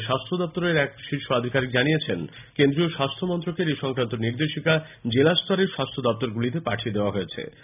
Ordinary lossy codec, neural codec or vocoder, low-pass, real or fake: none; none; 3.6 kHz; real